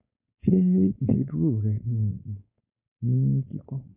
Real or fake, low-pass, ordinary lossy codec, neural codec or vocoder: fake; 3.6 kHz; none; codec, 16 kHz, 4.8 kbps, FACodec